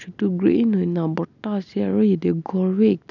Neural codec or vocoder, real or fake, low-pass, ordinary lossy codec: none; real; 7.2 kHz; none